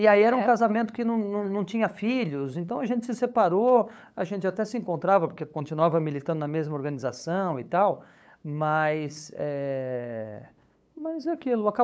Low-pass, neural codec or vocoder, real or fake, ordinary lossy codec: none; codec, 16 kHz, 8 kbps, FunCodec, trained on LibriTTS, 25 frames a second; fake; none